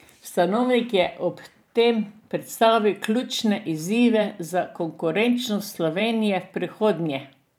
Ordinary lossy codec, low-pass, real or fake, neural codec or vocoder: none; 19.8 kHz; fake; vocoder, 44.1 kHz, 128 mel bands every 512 samples, BigVGAN v2